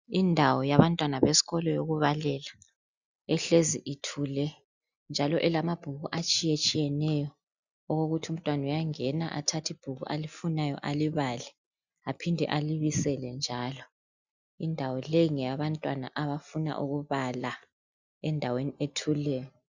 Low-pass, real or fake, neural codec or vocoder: 7.2 kHz; real; none